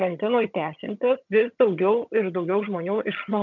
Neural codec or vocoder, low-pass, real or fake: vocoder, 22.05 kHz, 80 mel bands, HiFi-GAN; 7.2 kHz; fake